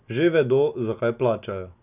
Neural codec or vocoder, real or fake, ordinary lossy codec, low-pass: none; real; none; 3.6 kHz